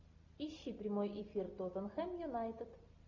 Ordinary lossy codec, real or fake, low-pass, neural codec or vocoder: MP3, 64 kbps; real; 7.2 kHz; none